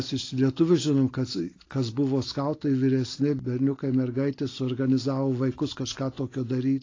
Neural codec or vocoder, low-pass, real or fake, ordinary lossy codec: none; 7.2 kHz; real; AAC, 32 kbps